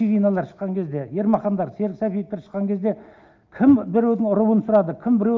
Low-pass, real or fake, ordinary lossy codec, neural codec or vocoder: 7.2 kHz; real; Opus, 24 kbps; none